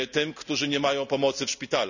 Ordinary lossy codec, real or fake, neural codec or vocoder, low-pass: none; real; none; 7.2 kHz